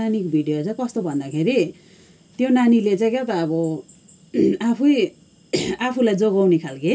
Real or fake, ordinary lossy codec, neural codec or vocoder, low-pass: real; none; none; none